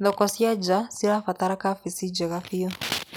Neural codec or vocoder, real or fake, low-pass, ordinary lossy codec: none; real; none; none